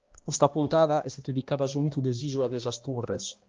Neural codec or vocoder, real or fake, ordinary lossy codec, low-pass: codec, 16 kHz, 1 kbps, X-Codec, HuBERT features, trained on balanced general audio; fake; Opus, 32 kbps; 7.2 kHz